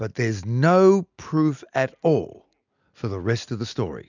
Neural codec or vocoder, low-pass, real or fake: none; 7.2 kHz; real